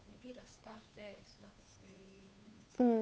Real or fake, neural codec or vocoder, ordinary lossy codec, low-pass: fake; codec, 16 kHz, 2 kbps, FunCodec, trained on Chinese and English, 25 frames a second; none; none